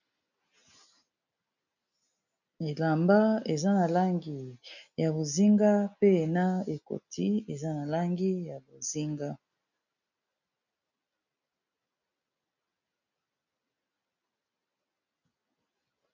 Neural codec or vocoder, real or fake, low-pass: none; real; 7.2 kHz